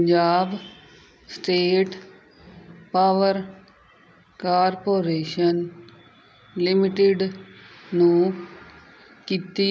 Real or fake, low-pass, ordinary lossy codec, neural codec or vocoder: real; none; none; none